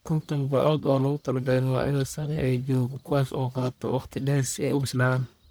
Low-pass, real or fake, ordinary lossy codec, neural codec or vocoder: none; fake; none; codec, 44.1 kHz, 1.7 kbps, Pupu-Codec